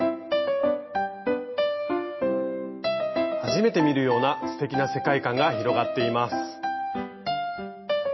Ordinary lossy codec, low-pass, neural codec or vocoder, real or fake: MP3, 24 kbps; 7.2 kHz; none; real